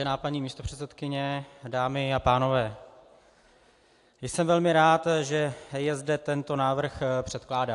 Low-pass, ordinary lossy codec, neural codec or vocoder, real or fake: 10.8 kHz; AAC, 64 kbps; none; real